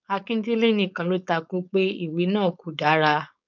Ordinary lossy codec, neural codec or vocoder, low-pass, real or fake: none; codec, 16 kHz, 4.8 kbps, FACodec; 7.2 kHz; fake